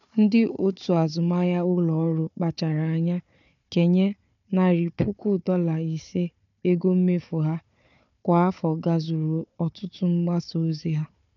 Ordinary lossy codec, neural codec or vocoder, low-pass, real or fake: none; codec, 16 kHz, 4 kbps, FunCodec, trained on Chinese and English, 50 frames a second; 7.2 kHz; fake